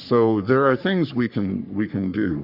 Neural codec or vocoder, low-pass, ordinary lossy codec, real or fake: codec, 44.1 kHz, 3.4 kbps, Pupu-Codec; 5.4 kHz; Opus, 64 kbps; fake